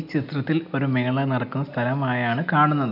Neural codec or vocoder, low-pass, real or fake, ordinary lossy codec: none; 5.4 kHz; real; none